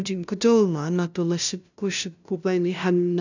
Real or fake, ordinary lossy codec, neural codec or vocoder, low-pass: fake; none; codec, 16 kHz, 0.5 kbps, FunCodec, trained on LibriTTS, 25 frames a second; 7.2 kHz